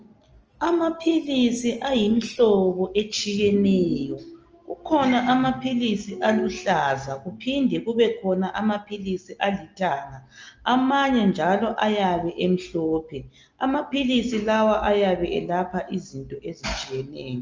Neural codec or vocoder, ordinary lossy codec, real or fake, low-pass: none; Opus, 24 kbps; real; 7.2 kHz